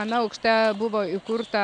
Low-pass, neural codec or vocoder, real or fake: 10.8 kHz; none; real